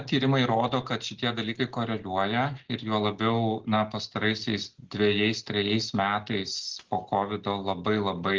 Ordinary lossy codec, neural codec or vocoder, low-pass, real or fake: Opus, 16 kbps; none; 7.2 kHz; real